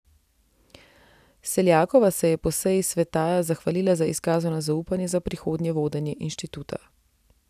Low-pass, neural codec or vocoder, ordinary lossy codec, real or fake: 14.4 kHz; none; none; real